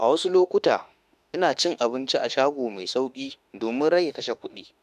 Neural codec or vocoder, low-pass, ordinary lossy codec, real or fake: autoencoder, 48 kHz, 32 numbers a frame, DAC-VAE, trained on Japanese speech; 14.4 kHz; none; fake